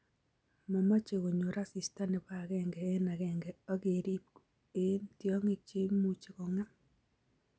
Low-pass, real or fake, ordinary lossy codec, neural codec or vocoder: none; real; none; none